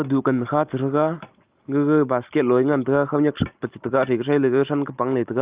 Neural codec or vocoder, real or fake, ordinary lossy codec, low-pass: none; real; Opus, 24 kbps; 3.6 kHz